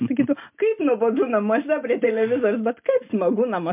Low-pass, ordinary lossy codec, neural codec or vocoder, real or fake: 3.6 kHz; MP3, 32 kbps; none; real